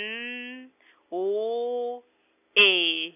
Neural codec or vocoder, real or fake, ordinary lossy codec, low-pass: none; real; none; 3.6 kHz